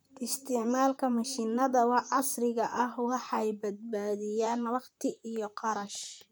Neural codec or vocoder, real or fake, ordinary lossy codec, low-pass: vocoder, 44.1 kHz, 128 mel bands, Pupu-Vocoder; fake; none; none